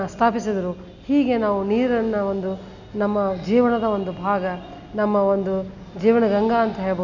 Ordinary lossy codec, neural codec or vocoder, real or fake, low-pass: none; none; real; 7.2 kHz